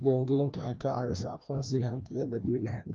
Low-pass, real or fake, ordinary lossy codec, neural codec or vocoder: 7.2 kHz; fake; Opus, 32 kbps; codec, 16 kHz, 1 kbps, FreqCodec, larger model